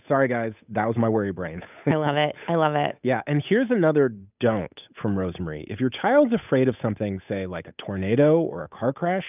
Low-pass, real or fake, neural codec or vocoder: 3.6 kHz; fake; codec, 16 kHz, 8 kbps, FunCodec, trained on Chinese and English, 25 frames a second